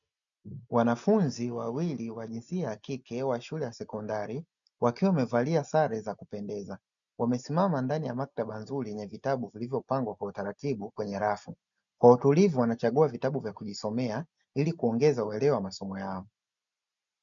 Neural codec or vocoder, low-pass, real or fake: none; 7.2 kHz; real